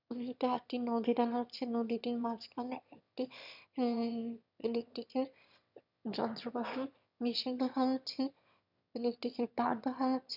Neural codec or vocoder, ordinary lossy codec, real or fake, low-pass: autoencoder, 22.05 kHz, a latent of 192 numbers a frame, VITS, trained on one speaker; none; fake; 5.4 kHz